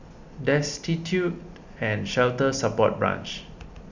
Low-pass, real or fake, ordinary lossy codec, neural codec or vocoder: 7.2 kHz; real; Opus, 64 kbps; none